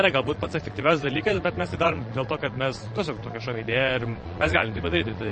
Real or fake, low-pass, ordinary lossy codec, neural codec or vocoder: fake; 9.9 kHz; MP3, 32 kbps; vocoder, 22.05 kHz, 80 mel bands, Vocos